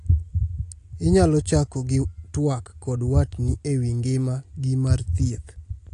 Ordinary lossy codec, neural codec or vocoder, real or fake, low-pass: AAC, 48 kbps; none; real; 10.8 kHz